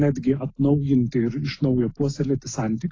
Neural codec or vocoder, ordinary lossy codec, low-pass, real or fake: none; AAC, 32 kbps; 7.2 kHz; real